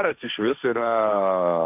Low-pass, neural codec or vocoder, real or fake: 3.6 kHz; codec, 16 kHz, 1.1 kbps, Voila-Tokenizer; fake